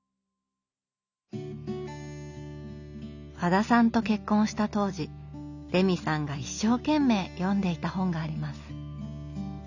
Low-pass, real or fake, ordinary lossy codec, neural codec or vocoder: 7.2 kHz; real; none; none